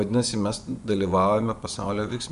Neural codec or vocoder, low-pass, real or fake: vocoder, 24 kHz, 100 mel bands, Vocos; 10.8 kHz; fake